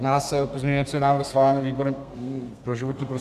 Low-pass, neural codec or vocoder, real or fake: 14.4 kHz; codec, 32 kHz, 1.9 kbps, SNAC; fake